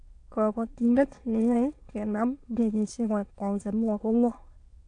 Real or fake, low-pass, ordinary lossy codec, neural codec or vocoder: fake; 9.9 kHz; AAC, 48 kbps; autoencoder, 22.05 kHz, a latent of 192 numbers a frame, VITS, trained on many speakers